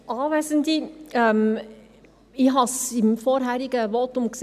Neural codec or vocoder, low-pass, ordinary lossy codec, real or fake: none; 14.4 kHz; none; real